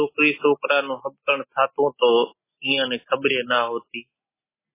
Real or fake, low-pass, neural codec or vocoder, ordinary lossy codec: real; 3.6 kHz; none; MP3, 16 kbps